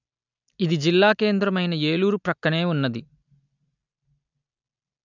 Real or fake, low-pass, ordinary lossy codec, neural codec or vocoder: real; 7.2 kHz; none; none